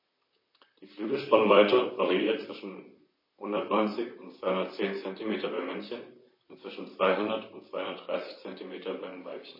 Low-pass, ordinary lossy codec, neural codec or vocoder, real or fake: 5.4 kHz; MP3, 24 kbps; vocoder, 44.1 kHz, 128 mel bands, Pupu-Vocoder; fake